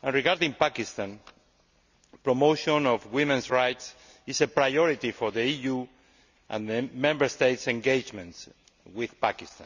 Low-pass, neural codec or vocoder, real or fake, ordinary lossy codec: 7.2 kHz; none; real; none